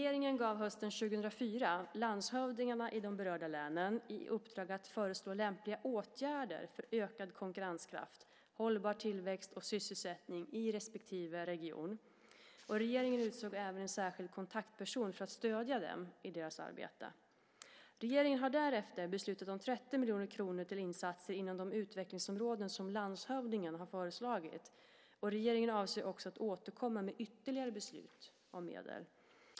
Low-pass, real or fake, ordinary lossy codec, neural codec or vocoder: none; real; none; none